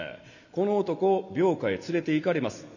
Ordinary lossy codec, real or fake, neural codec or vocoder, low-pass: none; real; none; 7.2 kHz